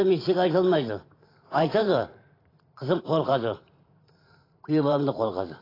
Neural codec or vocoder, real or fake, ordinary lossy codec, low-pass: vocoder, 44.1 kHz, 128 mel bands every 512 samples, BigVGAN v2; fake; AAC, 24 kbps; 5.4 kHz